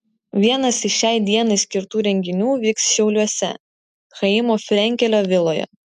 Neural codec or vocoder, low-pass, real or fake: none; 14.4 kHz; real